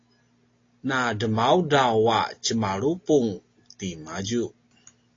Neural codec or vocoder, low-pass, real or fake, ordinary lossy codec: none; 7.2 kHz; real; AAC, 32 kbps